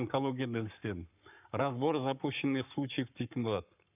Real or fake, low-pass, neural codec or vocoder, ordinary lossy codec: fake; 3.6 kHz; codec, 44.1 kHz, 7.8 kbps, Pupu-Codec; none